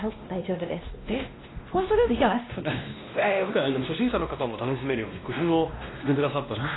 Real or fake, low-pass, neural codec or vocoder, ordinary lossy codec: fake; 7.2 kHz; codec, 16 kHz, 1 kbps, X-Codec, WavLM features, trained on Multilingual LibriSpeech; AAC, 16 kbps